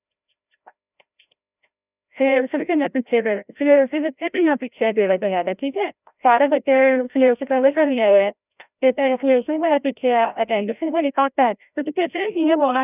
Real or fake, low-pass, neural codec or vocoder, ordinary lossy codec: fake; 3.6 kHz; codec, 16 kHz, 0.5 kbps, FreqCodec, larger model; none